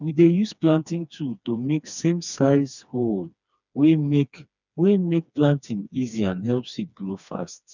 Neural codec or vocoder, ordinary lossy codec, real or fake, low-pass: codec, 16 kHz, 2 kbps, FreqCodec, smaller model; none; fake; 7.2 kHz